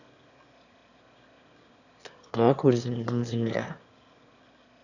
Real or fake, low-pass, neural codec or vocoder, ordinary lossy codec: fake; 7.2 kHz; autoencoder, 22.05 kHz, a latent of 192 numbers a frame, VITS, trained on one speaker; none